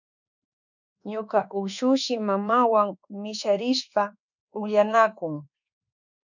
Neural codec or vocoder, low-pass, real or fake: codec, 24 kHz, 1.2 kbps, DualCodec; 7.2 kHz; fake